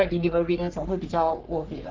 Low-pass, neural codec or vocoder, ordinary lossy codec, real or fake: 7.2 kHz; codec, 44.1 kHz, 3.4 kbps, Pupu-Codec; Opus, 24 kbps; fake